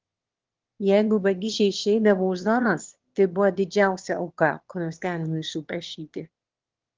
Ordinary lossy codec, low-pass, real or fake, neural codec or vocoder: Opus, 16 kbps; 7.2 kHz; fake; autoencoder, 22.05 kHz, a latent of 192 numbers a frame, VITS, trained on one speaker